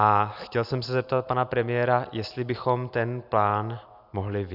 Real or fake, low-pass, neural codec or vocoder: real; 5.4 kHz; none